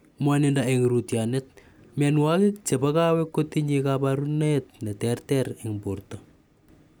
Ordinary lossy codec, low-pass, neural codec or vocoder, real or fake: none; none; none; real